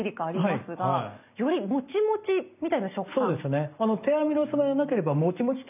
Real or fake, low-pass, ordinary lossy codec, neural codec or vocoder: real; 3.6 kHz; none; none